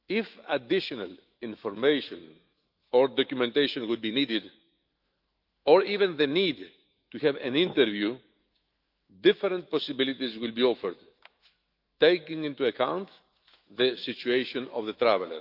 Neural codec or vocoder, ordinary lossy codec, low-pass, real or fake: autoencoder, 48 kHz, 128 numbers a frame, DAC-VAE, trained on Japanese speech; Opus, 24 kbps; 5.4 kHz; fake